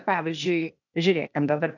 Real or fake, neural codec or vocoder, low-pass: fake; codec, 16 kHz, 0.8 kbps, ZipCodec; 7.2 kHz